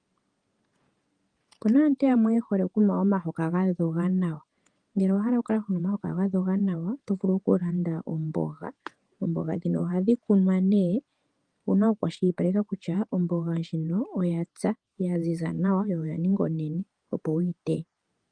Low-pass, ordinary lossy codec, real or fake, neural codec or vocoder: 9.9 kHz; Opus, 32 kbps; fake; vocoder, 48 kHz, 128 mel bands, Vocos